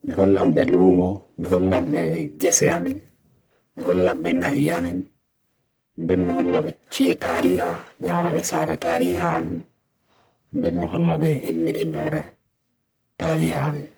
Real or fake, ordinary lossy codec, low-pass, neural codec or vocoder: fake; none; none; codec, 44.1 kHz, 1.7 kbps, Pupu-Codec